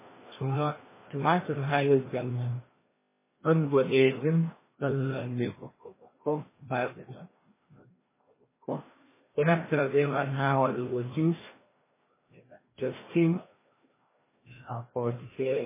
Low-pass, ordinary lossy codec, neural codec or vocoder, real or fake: 3.6 kHz; MP3, 16 kbps; codec, 16 kHz, 1 kbps, FreqCodec, larger model; fake